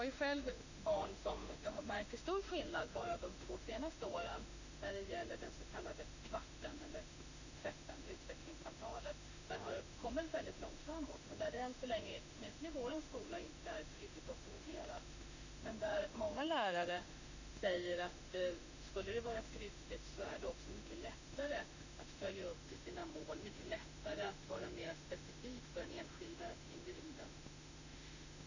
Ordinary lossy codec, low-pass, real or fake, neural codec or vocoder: none; 7.2 kHz; fake; autoencoder, 48 kHz, 32 numbers a frame, DAC-VAE, trained on Japanese speech